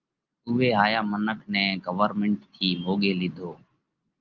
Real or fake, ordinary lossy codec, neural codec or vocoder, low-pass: real; Opus, 24 kbps; none; 7.2 kHz